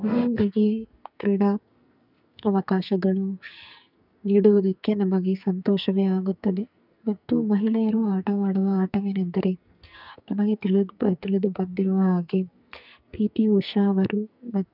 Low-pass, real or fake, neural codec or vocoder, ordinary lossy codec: 5.4 kHz; fake; codec, 44.1 kHz, 2.6 kbps, SNAC; none